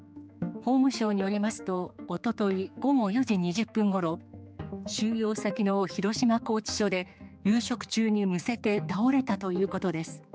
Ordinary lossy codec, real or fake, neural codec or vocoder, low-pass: none; fake; codec, 16 kHz, 4 kbps, X-Codec, HuBERT features, trained on general audio; none